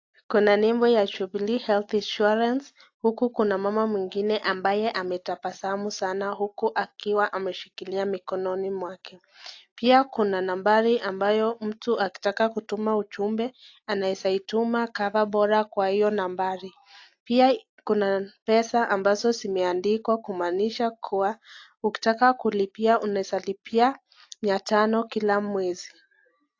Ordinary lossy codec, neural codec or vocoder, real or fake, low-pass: AAC, 48 kbps; none; real; 7.2 kHz